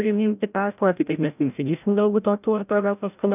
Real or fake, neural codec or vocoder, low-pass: fake; codec, 16 kHz, 0.5 kbps, FreqCodec, larger model; 3.6 kHz